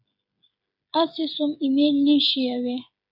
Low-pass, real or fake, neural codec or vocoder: 5.4 kHz; fake; codec, 16 kHz, 8 kbps, FreqCodec, smaller model